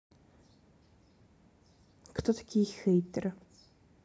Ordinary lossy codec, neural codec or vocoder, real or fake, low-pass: none; none; real; none